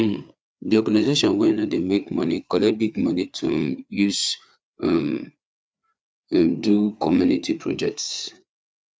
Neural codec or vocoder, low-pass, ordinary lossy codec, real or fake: codec, 16 kHz, 4 kbps, FreqCodec, larger model; none; none; fake